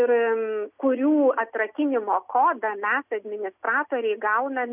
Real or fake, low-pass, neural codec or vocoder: real; 3.6 kHz; none